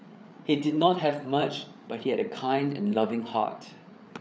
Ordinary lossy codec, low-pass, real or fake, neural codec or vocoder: none; none; fake; codec, 16 kHz, 8 kbps, FreqCodec, larger model